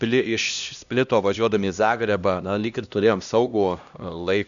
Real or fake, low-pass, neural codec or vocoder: fake; 7.2 kHz; codec, 16 kHz, 1 kbps, X-Codec, HuBERT features, trained on LibriSpeech